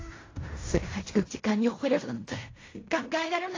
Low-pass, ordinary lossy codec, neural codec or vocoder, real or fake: 7.2 kHz; AAC, 32 kbps; codec, 16 kHz in and 24 kHz out, 0.4 kbps, LongCat-Audio-Codec, fine tuned four codebook decoder; fake